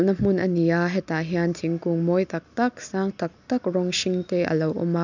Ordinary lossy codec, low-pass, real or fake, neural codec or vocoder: none; 7.2 kHz; real; none